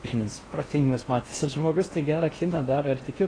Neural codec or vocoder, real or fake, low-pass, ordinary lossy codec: codec, 16 kHz in and 24 kHz out, 0.8 kbps, FocalCodec, streaming, 65536 codes; fake; 9.9 kHz; AAC, 32 kbps